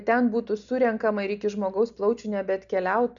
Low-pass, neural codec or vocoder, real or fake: 7.2 kHz; none; real